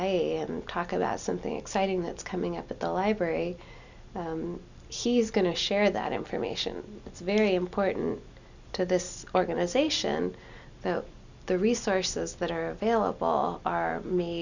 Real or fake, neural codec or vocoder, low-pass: real; none; 7.2 kHz